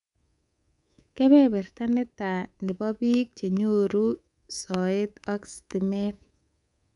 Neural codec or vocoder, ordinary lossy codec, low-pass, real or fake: codec, 24 kHz, 3.1 kbps, DualCodec; none; 10.8 kHz; fake